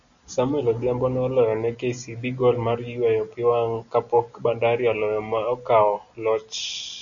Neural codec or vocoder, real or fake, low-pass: none; real; 7.2 kHz